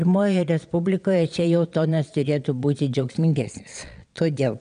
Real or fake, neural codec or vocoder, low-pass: fake; vocoder, 22.05 kHz, 80 mel bands, Vocos; 9.9 kHz